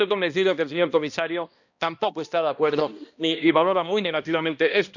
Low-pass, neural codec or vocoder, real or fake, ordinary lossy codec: 7.2 kHz; codec, 16 kHz, 1 kbps, X-Codec, HuBERT features, trained on balanced general audio; fake; none